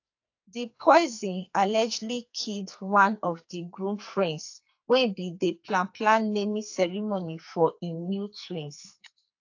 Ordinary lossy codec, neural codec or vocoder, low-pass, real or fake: AAC, 48 kbps; codec, 44.1 kHz, 2.6 kbps, SNAC; 7.2 kHz; fake